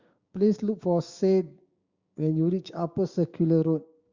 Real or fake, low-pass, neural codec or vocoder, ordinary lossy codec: fake; 7.2 kHz; codec, 16 kHz, 6 kbps, DAC; Opus, 64 kbps